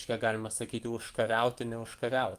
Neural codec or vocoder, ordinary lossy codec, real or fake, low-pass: codec, 44.1 kHz, 7.8 kbps, DAC; Opus, 24 kbps; fake; 14.4 kHz